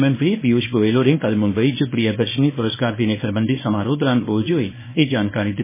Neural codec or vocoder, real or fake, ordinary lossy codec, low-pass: codec, 24 kHz, 0.9 kbps, WavTokenizer, small release; fake; MP3, 16 kbps; 3.6 kHz